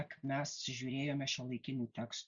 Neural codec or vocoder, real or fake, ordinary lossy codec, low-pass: none; real; Opus, 64 kbps; 7.2 kHz